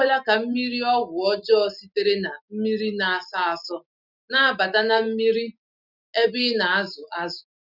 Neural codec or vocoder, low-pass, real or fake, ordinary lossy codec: none; 5.4 kHz; real; none